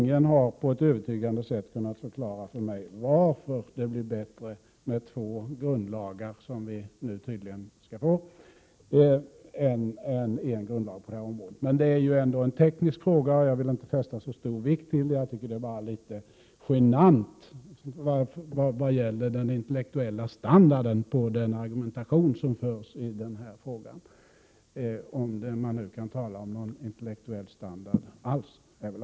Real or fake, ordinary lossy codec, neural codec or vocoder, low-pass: real; none; none; none